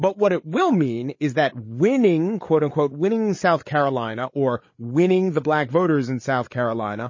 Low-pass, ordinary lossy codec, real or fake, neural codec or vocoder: 7.2 kHz; MP3, 32 kbps; fake; codec, 16 kHz, 8 kbps, FreqCodec, larger model